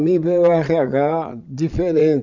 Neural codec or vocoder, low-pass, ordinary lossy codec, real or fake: vocoder, 22.05 kHz, 80 mel bands, Vocos; 7.2 kHz; none; fake